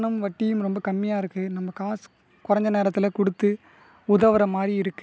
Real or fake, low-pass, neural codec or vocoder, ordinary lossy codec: real; none; none; none